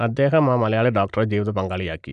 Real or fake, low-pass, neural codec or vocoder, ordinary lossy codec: real; 9.9 kHz; none; none